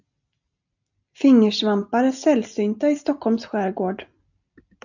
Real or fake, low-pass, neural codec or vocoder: real; 7.2 kHz; none